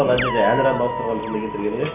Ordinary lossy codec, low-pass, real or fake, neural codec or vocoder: none; 3.6 kHz; real; none